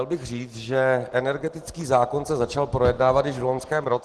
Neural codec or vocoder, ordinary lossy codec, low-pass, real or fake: none; Opus, 16 kbps; 10.8 kHz; real